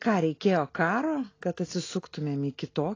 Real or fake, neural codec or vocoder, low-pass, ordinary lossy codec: real; none; 7.2 kHz; AAC, 32 kbps